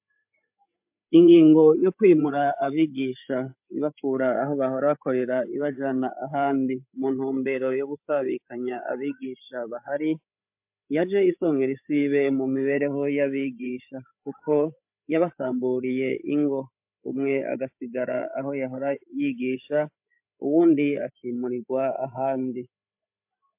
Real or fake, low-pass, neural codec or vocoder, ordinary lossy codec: fake; 3.6 kHz; codec, 16 kHz, 8 kbps, FreqCodec, larger model; MP3, 32 kbps